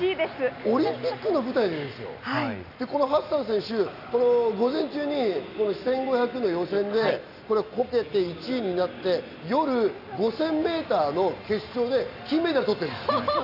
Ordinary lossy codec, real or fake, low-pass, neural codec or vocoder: none; real; 5.4 kHz; none